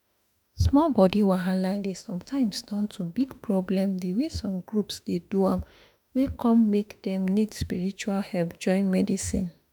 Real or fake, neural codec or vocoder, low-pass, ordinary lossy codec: fake; autoencoder, 48 kHz, 32 numbers a frame, DAC-VAE, trained on Japanese speech; none; none